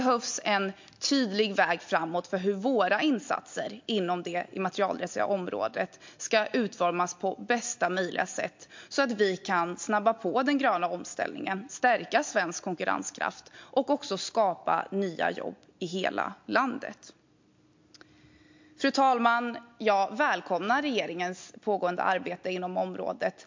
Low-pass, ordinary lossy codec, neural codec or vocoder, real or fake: 7.2 kHz; MP3, 48 kbps; none; real